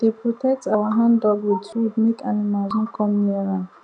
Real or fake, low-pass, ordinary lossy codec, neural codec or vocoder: real; 9.9 kHz; none; none